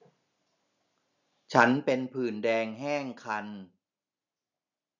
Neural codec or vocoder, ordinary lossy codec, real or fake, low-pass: none; none; real; 7.2 kHz